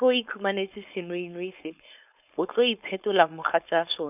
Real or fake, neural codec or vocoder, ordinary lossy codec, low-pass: fake; codec, 16 kHz, 4.8 kbps, FACodec; none; 3.6 kHz